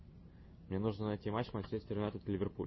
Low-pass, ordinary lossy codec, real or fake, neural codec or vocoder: 7.2 kHz; MP3, 24 kbps; real; none